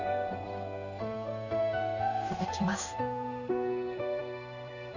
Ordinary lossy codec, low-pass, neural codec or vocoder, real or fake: none; 7.2 kHz; codec, 16 kHz in and 24 kHz out, 1 kbps, XY-Tokenizer; fake